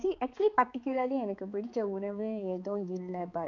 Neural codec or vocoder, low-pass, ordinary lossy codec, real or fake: codec, 16 kHz, 4 kbps, X-Codec, HuBERT features, trained on balanced general audio; 7.2 kHz; none; fake